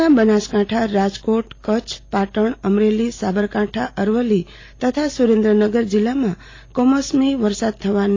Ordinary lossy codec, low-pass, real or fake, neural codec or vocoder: AAC, 32 kbps; 7.2 kHz; real; none